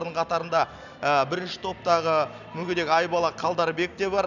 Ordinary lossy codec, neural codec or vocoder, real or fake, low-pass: none; none; real; 7.2 kHz